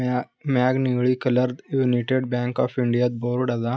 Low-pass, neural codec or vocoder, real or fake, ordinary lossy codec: none; none; real; none